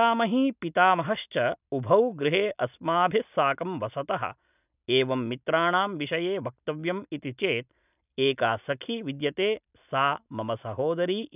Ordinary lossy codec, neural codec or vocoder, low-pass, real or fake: none; none; 3.6 kHz; real